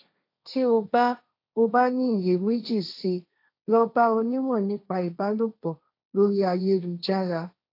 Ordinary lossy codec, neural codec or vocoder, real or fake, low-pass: AAC, 32 kbps; codec, 16 kHz, 1.1 kbps, Voila-Tokenizer; fake; 5.4 kHz